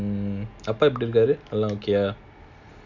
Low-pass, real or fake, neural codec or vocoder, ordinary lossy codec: 7.2 kHz; real; none; none